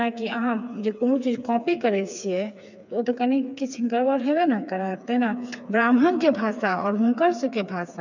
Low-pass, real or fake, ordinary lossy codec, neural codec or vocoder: 7.2 kHz; fake; none; codec, 16 kHz, 4 kbps, FreqCodec, smaller model